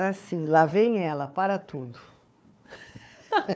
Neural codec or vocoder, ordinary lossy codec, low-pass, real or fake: codec, 16 kHz, 4 kbps, FunCodec, trained on Chinese and English, 50 frames a second; none; none; fake